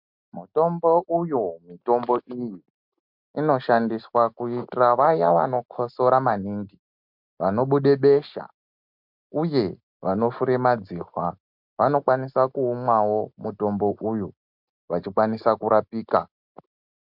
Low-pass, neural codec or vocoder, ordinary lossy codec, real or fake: 5.4 kHz; none; MP3, 48 kbps; real